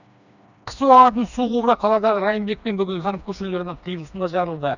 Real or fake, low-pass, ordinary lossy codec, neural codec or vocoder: fake; 7.2 kHz; none; codec, 16 kHz, 2 kbps, FreqCodec, smaller model